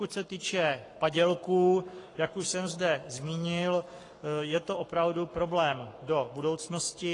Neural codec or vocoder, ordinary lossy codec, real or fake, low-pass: codec, 44.1 kHz, 7.8 kbps, Pupu-Codec; AAC, 32 kbps; fake; 10.8 kHz